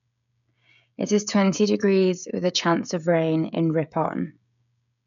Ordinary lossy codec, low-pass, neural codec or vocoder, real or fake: none; 7.2 kHz; codec, 16 kHz, 16 kbps, FreqCodec, smaller model; fake